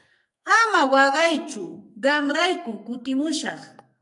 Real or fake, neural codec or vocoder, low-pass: fake; codec, 44.1 kHz, 2.6 kbps, SNAC; 10.8 kHz